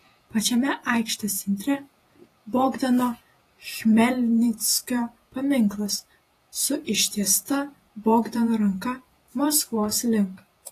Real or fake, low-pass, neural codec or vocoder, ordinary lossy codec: fake; 14.4 kHz; vocoder, 48 kHz, 128 mel bands, Vocos; AAC, 48 kbps